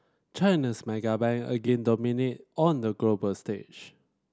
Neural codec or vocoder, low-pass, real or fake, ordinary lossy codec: none; none; real; none